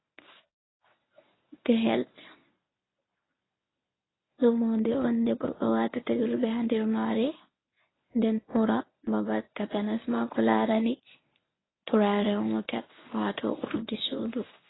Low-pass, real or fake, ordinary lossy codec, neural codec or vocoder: 7.2 kHz; fake; AAC, 16 kbps; codec, 24 kHz, 0.9 kbps, WavTokenizer, medium speech release version 1